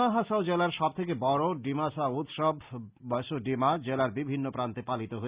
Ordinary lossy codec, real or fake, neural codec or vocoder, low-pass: Opus, 64 kbps; real; none; 3.6 kHz